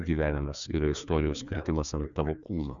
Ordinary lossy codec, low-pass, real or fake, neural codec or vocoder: MP3, 96 kbps; 7.2 kHz; fake; codec, 16 kHz, 2 kbps, FreqCodec, larger model